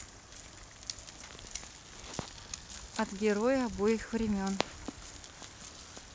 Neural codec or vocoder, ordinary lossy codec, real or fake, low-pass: none; none; real; none